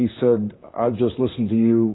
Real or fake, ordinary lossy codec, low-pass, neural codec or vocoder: fake; AAC, 16 kbps; 7.2 kHz; vocoder, 44.1 kHz, 128 mel bands, Pupu-Vocoder